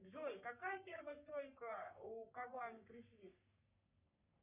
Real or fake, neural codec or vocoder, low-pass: fake; codec, 44.1 kHz, 3.4 kbps, Pupu-Codec; 3.6 kHz